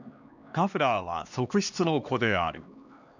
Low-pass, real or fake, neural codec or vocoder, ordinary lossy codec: 7.2 kHz; fake; codec, 16 kHz, 2 kbps, X-Codec, HuBERT features, trained on LibriSpeech; none